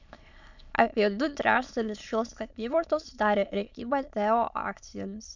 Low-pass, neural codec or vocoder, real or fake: 7.2 kHz; autoencoder, 22.05 kHz, a latent of 192 numbers a frame, VITS, trained on many speakers; fake